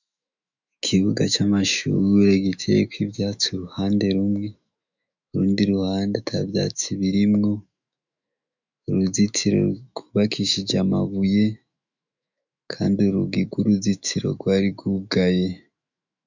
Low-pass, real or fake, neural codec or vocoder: 7.2 kHz; fake; autoencoder, 48 kHz, 128 numbers a frame, DAC-VAE, trained on Japanese speech